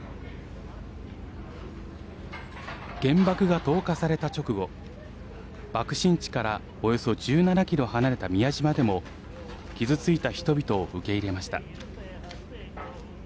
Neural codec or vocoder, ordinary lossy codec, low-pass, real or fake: none; none; none; real